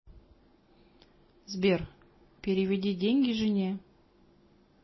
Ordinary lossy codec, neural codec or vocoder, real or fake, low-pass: MP3, 24 kbps; none; real; 7.2 kHz